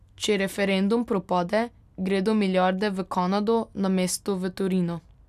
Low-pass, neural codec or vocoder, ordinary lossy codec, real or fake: 14.4 kHz; none; none; real